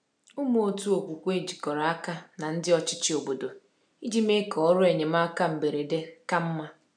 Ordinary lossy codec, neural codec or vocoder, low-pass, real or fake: none; none; 9.9 kHz; real